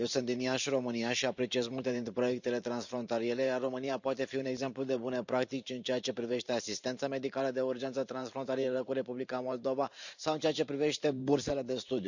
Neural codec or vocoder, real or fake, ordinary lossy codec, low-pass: vocoder, 44.1 kHz, 128 mel bands every 512 samples, BigVGAN v2; fake; none; 7.2 kHz